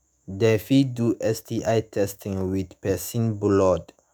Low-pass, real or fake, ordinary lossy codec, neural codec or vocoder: none; fake; none; autoencoder, 48 kHz, 128 numbers a frame, DAC-VAE, trained on Japanese speech